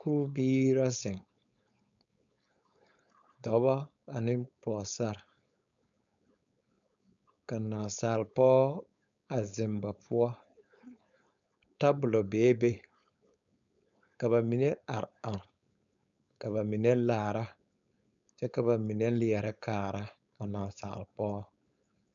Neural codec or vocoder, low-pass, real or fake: codec, 16 kHz, 4.8 kbps, FACodec; 7.2 kHz; fake